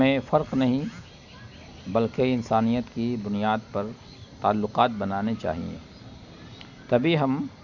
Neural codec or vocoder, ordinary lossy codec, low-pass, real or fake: none; none; 7.2 kHz; real